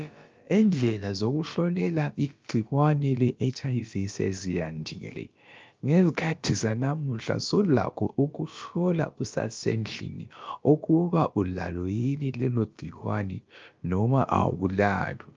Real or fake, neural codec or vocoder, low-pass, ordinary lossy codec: fake; codec, 16 kHz, about 1 kbps, DyCAST, with the encoder's durations; 7.2 kHz; Opus, 24 kbps